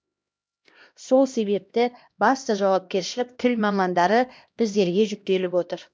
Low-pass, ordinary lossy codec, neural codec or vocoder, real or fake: none; none; codec, 16 kHz, 1 kbps, X-Codec, HuBERT features, trained on LibriSpeech; fake